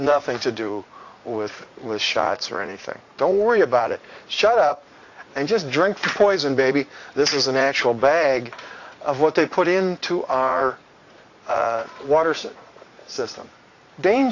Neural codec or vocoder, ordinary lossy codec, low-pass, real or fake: vocoder, 44.1 kHz, 80 mel bands, Vocos; AAC, 48 kbps; 7.2 kHz; fake